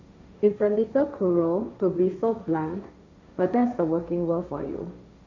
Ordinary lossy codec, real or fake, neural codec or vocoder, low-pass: MP3, 64 kbps; fake; codec, 16 kHz, 1.1 kbps, Voila-Tokenizer; 7.2 kHz